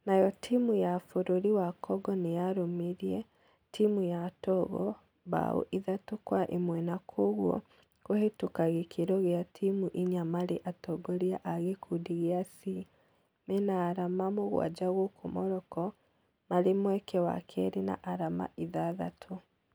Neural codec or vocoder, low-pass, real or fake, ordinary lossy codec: none; none; real; none